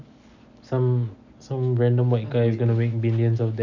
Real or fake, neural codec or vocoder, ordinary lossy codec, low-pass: real; none; none; 7.2 kHz